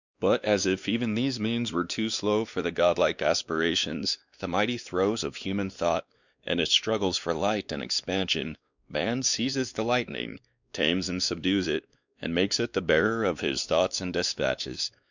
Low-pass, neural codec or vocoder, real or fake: 7.2 kHz; codec, 16 kHz, 2 kbps, X-Codec, WavLM features, trained on Multilingual LibriSpeech; fake